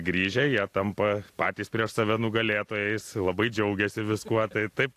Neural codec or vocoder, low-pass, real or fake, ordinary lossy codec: none; 14.4 kHz; real; AAC, 64 kbps